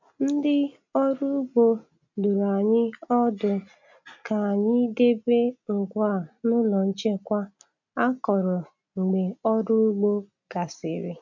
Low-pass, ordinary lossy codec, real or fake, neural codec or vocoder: 7.2 kHz; none; real; none